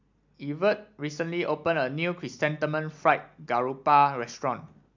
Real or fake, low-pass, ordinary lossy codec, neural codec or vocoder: real; 7.2 kHz; MP3, 64 kbps; none